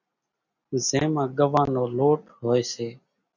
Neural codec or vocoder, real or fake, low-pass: none; real; 7.2 kHz